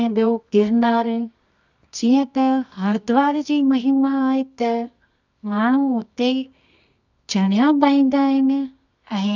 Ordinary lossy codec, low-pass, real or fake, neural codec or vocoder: none; 7.2 kHz; fake; codec, 24 kHz, 0.9 kbps, WavTokenizer, medium music audio release